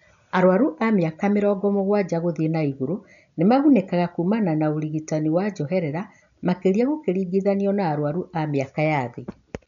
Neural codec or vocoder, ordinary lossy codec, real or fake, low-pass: none; none; real; 7.2 kHz